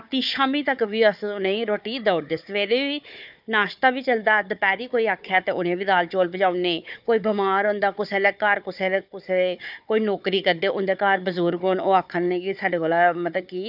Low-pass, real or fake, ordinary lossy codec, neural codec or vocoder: 5.4 kHz; fake; none; codec, 16 kHz, 4 kbps, FunCodec, trained on Chinese and English, 50 frames a second